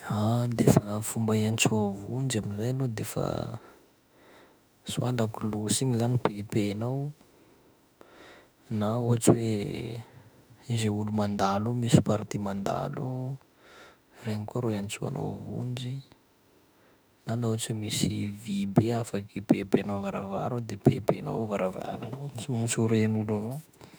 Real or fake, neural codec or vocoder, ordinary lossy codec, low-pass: fake; autoencoder, 48 kHz, 32 numbers a frame, DAC-VAE, trained on Japanese speech; none; none